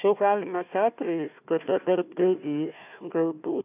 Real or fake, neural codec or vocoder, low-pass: fake; codec, 16 kHz, 1 kbps, FunCodec, trained on Chinese and English, 50 frames a second; 3.6 kHz